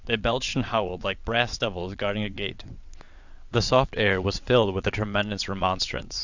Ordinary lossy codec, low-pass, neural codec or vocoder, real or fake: Opus, 64 kbps; 7.2 kHz; vocoder, 22.05 kHz, 80 mel bands, WaveNeXt; fake